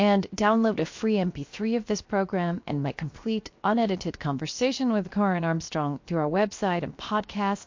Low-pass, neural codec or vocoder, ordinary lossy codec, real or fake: 7.2 kHz; codec, 16 kHz, 0.7 kbps, FocalCodec; MP3, 48 kbps; fake